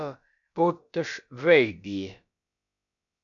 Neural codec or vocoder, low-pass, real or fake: codec, 16 kHz, about 1 kbps, DyCAST, with the encoder's durations; 7.2 kHz; fake